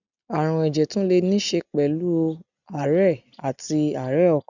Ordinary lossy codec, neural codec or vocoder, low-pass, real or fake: none; none; 7.2 kHz; real